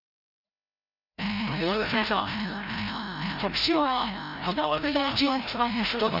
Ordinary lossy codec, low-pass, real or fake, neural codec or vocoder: none; 5.4 kHz; fake; codec, 16 kHz, 0.5 kbps, FreqCodec, larger model